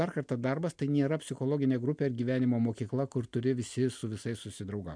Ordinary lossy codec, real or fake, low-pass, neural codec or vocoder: MP3, 64 kbps; real; 9.9 kHz; none